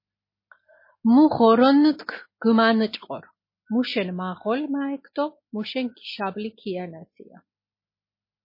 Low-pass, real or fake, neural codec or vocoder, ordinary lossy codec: 5.4 kHz; real; none; MP3, 24 kbps